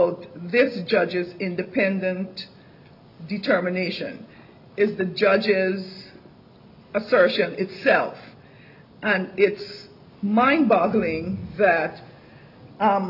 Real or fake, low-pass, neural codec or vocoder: real; 5.4 kHz; none